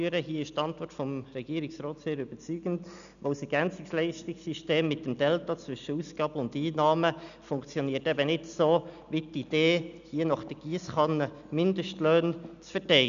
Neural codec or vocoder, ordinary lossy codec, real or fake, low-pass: none; none; real; 7.2 kHz